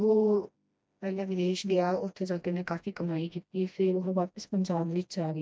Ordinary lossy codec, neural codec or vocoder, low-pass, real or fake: none; codec, 16 kHz, 1 kbps, FreqCodec, smaller model; none; fake